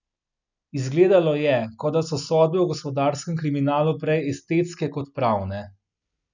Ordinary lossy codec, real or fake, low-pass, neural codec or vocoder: none; real; 7.2 kHz; none